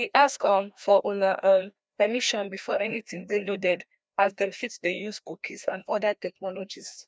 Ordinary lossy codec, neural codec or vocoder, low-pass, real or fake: none; codec, 16 kHz, 1 kbps, FreqCodec, larger model; none; fake